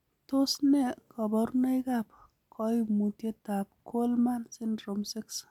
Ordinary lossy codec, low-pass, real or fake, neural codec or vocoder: none; 19.8 kHz; real; none